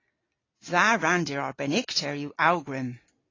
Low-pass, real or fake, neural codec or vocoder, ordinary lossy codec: 7.2 kHz; real; none; AAC, 32 kbps